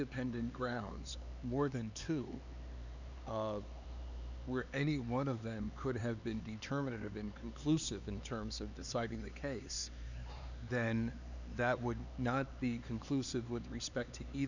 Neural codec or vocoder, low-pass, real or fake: codec, 16 kHz, 4 kbps, X-Codec, HuBERT features, trained on LibriSpeech; 7.2 kHz; fake